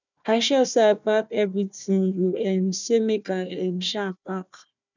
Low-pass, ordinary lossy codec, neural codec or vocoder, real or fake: 7.2 kHz; none; codec, 16 kHz, 1 kbps, FunCodec, trained on Chinese and English, 50 frames a second; fake